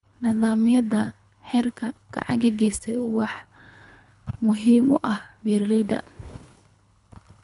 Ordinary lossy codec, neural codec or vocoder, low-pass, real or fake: none; codec, 24 kHz, 3 kbps, HILCodec; 10.8 kHz; fake